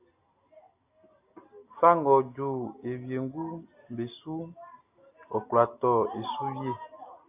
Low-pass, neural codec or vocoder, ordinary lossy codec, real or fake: 3.6 kHz; none; AAC, 32 kbps; real